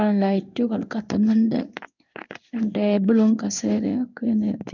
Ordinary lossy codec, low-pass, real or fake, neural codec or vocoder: none; 7.2 kHz; fake; codec, 16 kHz in and 24 kHz out, 1 kbps, XY-Tokenizer